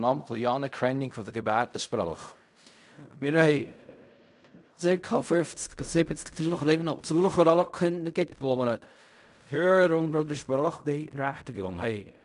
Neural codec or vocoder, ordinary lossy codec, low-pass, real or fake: codec, 16 kHz in and 24 kHz out, 0.4 kbps, LongCat-Audio-Codec, fine tuned four codebook decoder; none; 10.8 kHz; fake